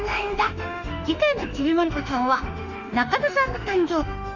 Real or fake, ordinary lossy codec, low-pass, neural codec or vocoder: fake; none; 7.2 kHz; autoencoder, 48 kHz, 32 numbers a frame, DAC-VAE, trained on Japanese speech